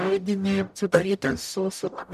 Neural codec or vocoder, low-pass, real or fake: codec, 44.1 kHz, 0.9 kbps, DAC; 14.4 kHz; fake